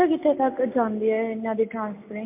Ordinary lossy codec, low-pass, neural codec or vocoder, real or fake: MP3, 24 kbps; 3.6 kHz; none; real